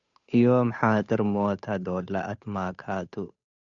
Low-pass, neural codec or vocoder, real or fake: 7.2 kHz; codec, 16 kHz, 8 kbps, FunCodec, trained on Chinese and English, 25 frames a second; fake